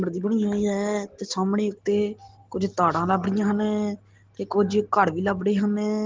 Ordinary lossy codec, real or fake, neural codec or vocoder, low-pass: Opus, 16 kbps; real; none; 7.2 kHz